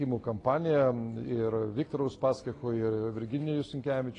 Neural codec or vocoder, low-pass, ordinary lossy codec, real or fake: none; 10.8 kHz; AAC, 32 kbps; real